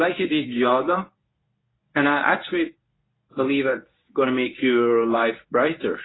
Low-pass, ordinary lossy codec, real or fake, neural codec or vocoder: 7.2 kHz; AAC, 16 kbps; fake; codec, 24 kHz, 0.9 kbps, WavTokenizer, medium speech release version 1